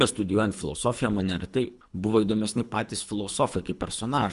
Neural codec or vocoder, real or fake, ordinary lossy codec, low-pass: codec, 24 kHz, 3 kbps, HILCodec; fake; Opus, 64 kbps; 10.8 kHz